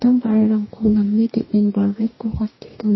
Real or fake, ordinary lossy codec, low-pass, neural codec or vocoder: fake; MP3, 24 kbps; 7.2 kHz; codec, 44.1 kHz, 2.6 kbps, DAC